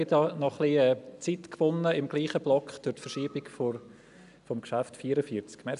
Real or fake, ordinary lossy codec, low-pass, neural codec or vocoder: real; none; 10.8 kHz; none